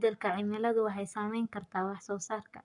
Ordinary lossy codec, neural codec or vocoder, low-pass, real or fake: none; codec, 44.1 kHz, 7.8 kbps, Pupu-Codec; 10.8 kHz; fake